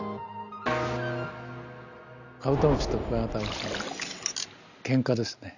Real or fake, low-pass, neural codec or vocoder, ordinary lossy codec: real; 7.2 kHz; none; none